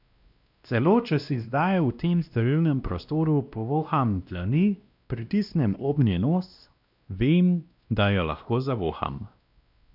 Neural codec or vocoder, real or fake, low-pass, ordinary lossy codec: codec, 16 kHz, 1 kbps, X-Codec, WavLM features, trained on Multilingual LibriSpeech; fake; 5.4 kHz; none